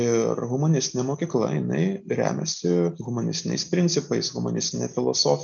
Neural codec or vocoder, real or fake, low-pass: none; real; 7.2 kHz